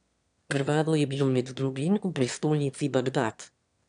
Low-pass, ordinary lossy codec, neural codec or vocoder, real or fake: 9.9 kHz; none; autoencoder, 22.05 kHz, a latent of 192 numbers a frame, VITS, trained on one speaker; fake